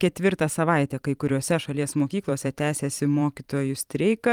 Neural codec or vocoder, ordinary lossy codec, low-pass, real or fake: vocoder, 44.1 kHz, 128 mel bands every 512 samples, BigVGAN v2; Opus, 32 kbps; 19.8 kHz; fake